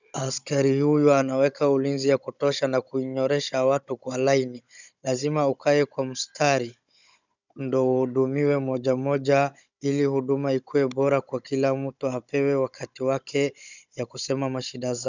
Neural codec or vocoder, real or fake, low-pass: codec, 16 kHz, 16 kbps, FunCodec, trained on Chinese and English, 50 frames a second; fake; 7.2 kHz